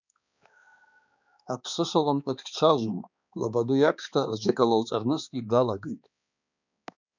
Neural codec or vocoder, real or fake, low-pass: codec, 16 kHz, 2 kbps, X-Codec, HuBERT features, trained on balanced general audio; fake; 7.2 kHz